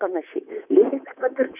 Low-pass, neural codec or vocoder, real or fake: 3.6 kHz; codec, 24 kHz, 3.1 kbps, DualCodec; fake